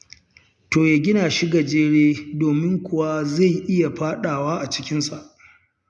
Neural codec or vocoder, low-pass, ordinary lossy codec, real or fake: none; 10.8 kHz; none; real